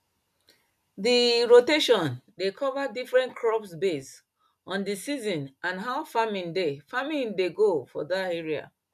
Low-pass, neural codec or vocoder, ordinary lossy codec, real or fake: 14.4 kHz; none; none; real